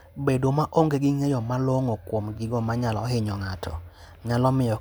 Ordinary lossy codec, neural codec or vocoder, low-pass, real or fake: none; none; none; real